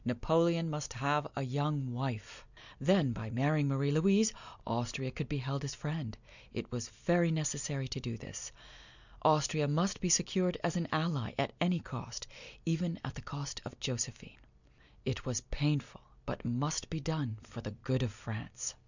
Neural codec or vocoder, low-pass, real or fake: none; 7.2 kHz; real